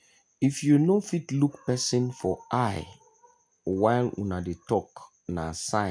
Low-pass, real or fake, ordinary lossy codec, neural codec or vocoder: 9.9 kHz; real; none; none